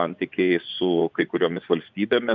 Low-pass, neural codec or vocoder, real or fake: 7.2 kHz; vocoder, 24 kHz, 100 mel bands, Vocos; fake